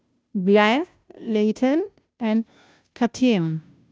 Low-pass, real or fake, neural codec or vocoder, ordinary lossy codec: none; fake; codec, 16 kHz, 0.5 kbps, FunCodec, trained on Chinese and English, 25 frames a second; none